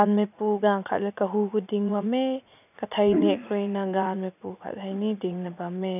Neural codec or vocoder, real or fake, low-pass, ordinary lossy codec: vocoder, 44.1 kHz, 80 mel bands, Vocos; fake; 3.6 kHz; none